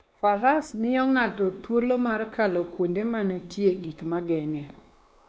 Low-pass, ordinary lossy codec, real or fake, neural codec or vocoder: none; none; fake; codec, 16 kHz, 2 kbps, X-Codec, WavLM features, trained on Multilingual LibriSpeech